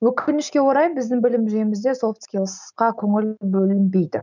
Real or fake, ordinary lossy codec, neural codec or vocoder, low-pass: real; none; none; 7.2 kHz